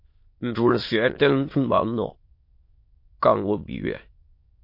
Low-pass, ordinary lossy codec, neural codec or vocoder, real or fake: 5.4 kHz; MP3, 32 kbps; autoencoder, 22.05 kHz, a latent of 192 numbers a frame, VITS, trained on many speakers; fake